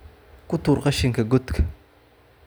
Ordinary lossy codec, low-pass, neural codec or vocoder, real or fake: none; none; none; real